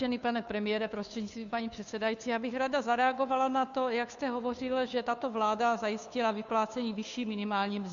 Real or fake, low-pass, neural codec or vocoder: fake; 7.2 kHz; codec, 16 kHz, 2 kbps, FunCodec, trained on Chinese and English, 25 frames a second